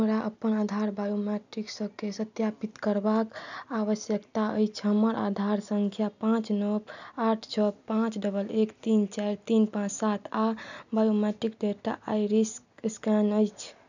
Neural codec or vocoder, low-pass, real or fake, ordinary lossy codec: none; 7.2 kHz; real; none